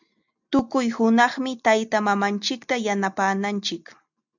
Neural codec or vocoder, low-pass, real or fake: none; 7.2 kHz; real